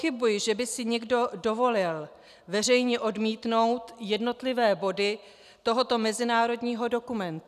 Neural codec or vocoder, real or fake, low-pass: none; real; 14.4 kHz